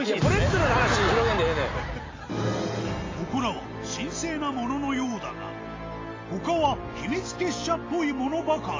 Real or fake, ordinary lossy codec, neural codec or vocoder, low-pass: real; AAC, 32 kbps; none; 7.2 kHz